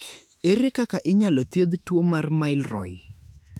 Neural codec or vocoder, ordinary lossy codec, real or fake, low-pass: autoencoder, 48 kHz, 32 numbers a frame, DAC-VAE, trained on Japanese speech; none; fake; 19.8 kHz